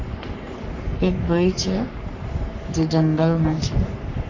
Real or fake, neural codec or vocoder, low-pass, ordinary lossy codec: fake; codec, 44.1 kHz, 3.4 kbps, Pupu-Codec; 7.2 kHz; none